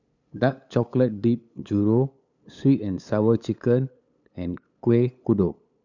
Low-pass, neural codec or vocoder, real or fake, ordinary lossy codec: 7.2 kHz; codec, 16 kHz, 8 kbps, FunCodec, trained on LibriTTS, 25 frames a second; fake; none